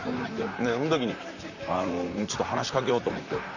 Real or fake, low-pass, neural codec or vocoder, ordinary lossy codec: fake; 7.2 kHz; vocoder, 44.1 kHz, 128 mel bands, Pupu-Vocoder; none